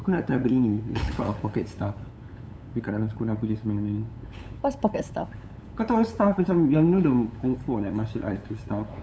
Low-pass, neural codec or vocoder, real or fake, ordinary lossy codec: none; codec, 16 kHz, 8 kbps, FunCodec, trained on LibriTTS, 25 frames a second; fake; none